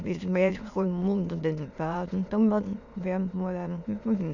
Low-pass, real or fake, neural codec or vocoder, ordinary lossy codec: 7.2 kHz; fake; autoencoder, 22.05 kHz, a latent of 192 numbers a frame, VITS, trained on many speakers; none